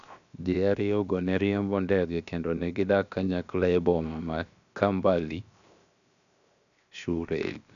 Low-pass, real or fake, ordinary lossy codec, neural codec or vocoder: 7.2 kHz; fake; none; codec, 16 kHz, 0.7 kbps, FocalCodec